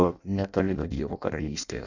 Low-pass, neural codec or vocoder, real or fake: 7.2 kHz; codec, 16 kHz in and 24 kHz out, 0.6 kbps, FireRedTTS-2 codec; fake